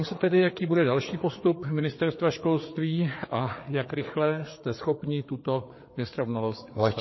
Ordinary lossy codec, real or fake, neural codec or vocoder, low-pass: MP3, 24 kbps; fake; codec, 16 kHz, 4 kbps, FreqCodec, larger model; 7.2 kHz